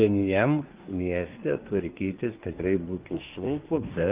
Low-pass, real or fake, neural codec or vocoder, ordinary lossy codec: 3.6 kHz; fake; codec, 24 kHz, 1 kbps, SNAC; Opus, 24 kbps